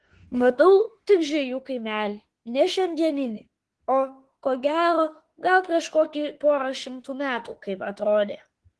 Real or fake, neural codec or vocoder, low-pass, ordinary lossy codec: fake; autoencoder, 48 kHz, 32 numbers a frame, DAC-VAE, trained on Japanese speech; 10.8 kHz; Opus, 16 kbps